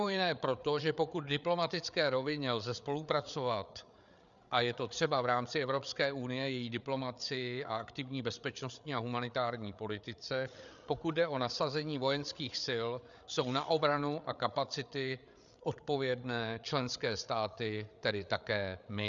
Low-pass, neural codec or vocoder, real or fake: 7.2 kHz; codec, 16 kHz, 8 kbps, FreqCodec, larger model; fake